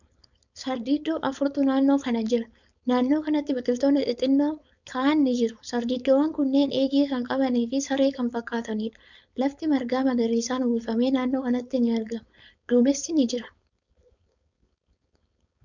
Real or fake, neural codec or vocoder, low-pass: fake; codec, 16 kHz, 4.8 kbps, FACodec; 7.2 kHz